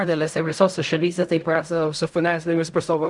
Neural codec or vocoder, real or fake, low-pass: codec, 16 kHz in and 24 kHz out, 0.4 kbps, LongCat-Audio-Codec, fine tuned four codebook decoder; fake; 10.8 kHz